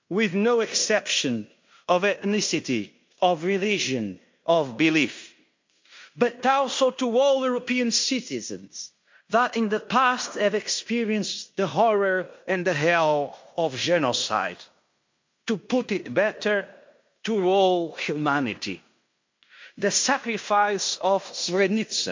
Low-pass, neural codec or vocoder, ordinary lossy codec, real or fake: 7.2 kHz; codec, 16 kHz in and 24 kHz out, 0.9 kbps, LongCat-Audio-Codec, fine tuned four codebook decoder; MP3, 48 kbps; fake